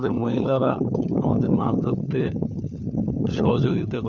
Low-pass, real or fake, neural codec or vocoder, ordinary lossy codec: 7.2 kHz; fake; codec, 16 kHz, 4 kbps, FunCodec, trained on LibriTTS, 50 frames a second; none